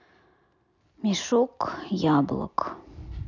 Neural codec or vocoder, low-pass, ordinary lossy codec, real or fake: none; 7.2 kHz; none; real